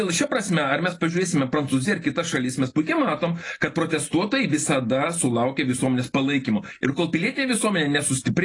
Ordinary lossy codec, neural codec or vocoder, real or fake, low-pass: AAC, 32 kbps; none; real; 10.8 kHz